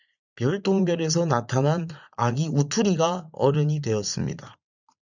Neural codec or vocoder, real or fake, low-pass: vocoder, 44.1 kHz, 80 mel bands, Vocos; fake; 7.2 kHz